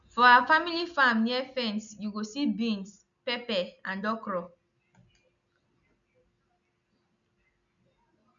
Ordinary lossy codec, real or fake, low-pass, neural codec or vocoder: none; real; 7.2 kHz; none